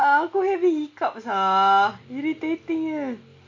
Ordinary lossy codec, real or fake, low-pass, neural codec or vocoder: MP3, 48 kbps; real; 7.2 kHz; none